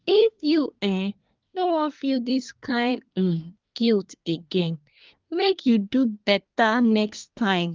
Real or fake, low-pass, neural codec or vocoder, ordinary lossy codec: fake; 7.2 kHz; codec, 24 kHz, 1 kbps, SNAC; Opus, 24 kbps